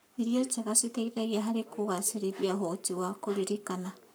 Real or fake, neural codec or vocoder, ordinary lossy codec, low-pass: fake; codec, 44.1 kHz, 7.8 kbps, Pupu-Codec; none; none